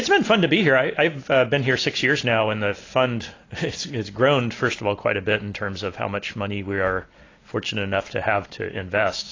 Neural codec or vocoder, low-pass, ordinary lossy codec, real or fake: none; 7.2 kHz; AAC, 32 kbps; real